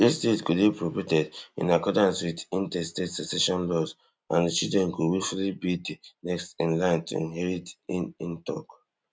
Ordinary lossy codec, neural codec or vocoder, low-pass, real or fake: none; none; none; real